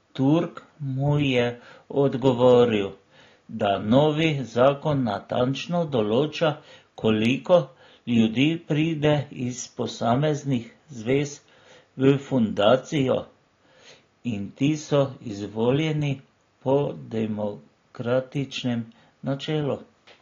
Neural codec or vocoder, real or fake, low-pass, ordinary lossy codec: none; real; 7.2 kHz; AAC, 32 kbps